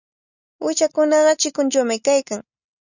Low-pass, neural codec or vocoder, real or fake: 7.2 kHz; none; real